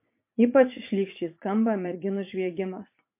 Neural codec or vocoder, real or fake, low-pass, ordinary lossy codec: vocoder, 44.1 kHz, 80 mel bands, Vocos; fake; 3.6 kHz; MP3, 24 kbps